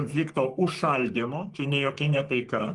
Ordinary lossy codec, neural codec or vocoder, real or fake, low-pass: Opus, 24 kbps; codec, 44.1 kHz, 3.4 kbps, Pupu-Codec; fake; 10.8 kHz